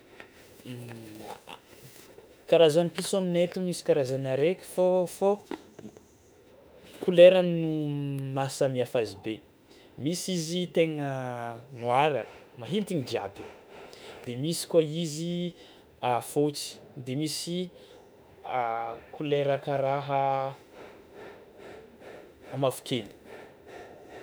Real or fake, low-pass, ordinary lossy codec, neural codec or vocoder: fake; none; none; autoencoder, 48 kHz, 32 numbers a frame, DAC-VAE, trained on Japanese speech